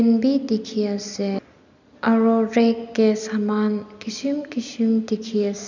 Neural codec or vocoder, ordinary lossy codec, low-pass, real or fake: none; none; 7.2 kHz; real